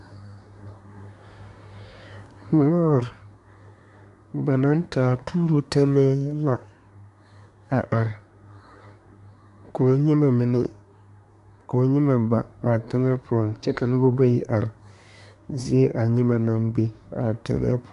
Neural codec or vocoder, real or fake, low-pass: codec, 24 kHz, 1 kbps, SNAC; fake; 10.8 kHz